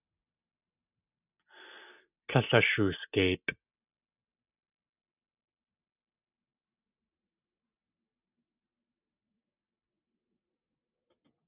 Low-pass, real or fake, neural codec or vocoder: 3.6 kHz; real; none